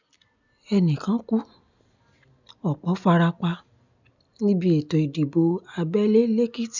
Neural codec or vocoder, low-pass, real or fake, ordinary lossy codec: none; 7.2 kHz; real; none